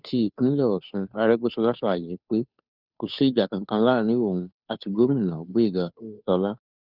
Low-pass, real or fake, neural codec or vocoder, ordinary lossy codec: 5.4 kHz; fake; codec, 16 kHz, 2 kbps, FunCodec, trained on Chinese and English, 25 frames a second; none